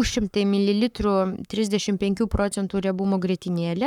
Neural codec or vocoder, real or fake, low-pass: codec, 44.1 kHz, 7.8 kbps, Pupu-Codec; fake; 19.8 kHz